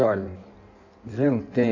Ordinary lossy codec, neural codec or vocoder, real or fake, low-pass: AAC, 48 kbps; codec, 16 kHz in and 24 kHz out, 1.1 kbps, FireRedTTS-2 codec; fake; 7.2 kHz